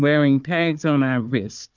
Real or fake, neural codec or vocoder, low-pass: fake; codec, 16 kHz, 4 kbps, FunCodec, trained on Chinese and English, 50 frames a second; 7.2 kHz